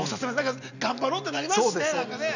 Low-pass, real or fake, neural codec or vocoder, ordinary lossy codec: 7.2 kHz; real; none; none